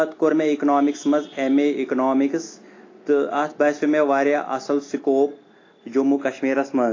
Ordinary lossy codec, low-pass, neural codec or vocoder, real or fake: AAC, 32 kbps; 7.2 kHz; none; real